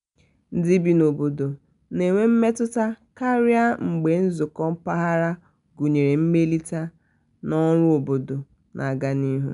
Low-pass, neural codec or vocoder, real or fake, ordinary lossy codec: 10.8 kHz; none; real; none